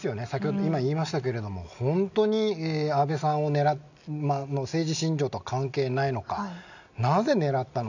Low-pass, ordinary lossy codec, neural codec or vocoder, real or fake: 7.2 kHz; none; none; real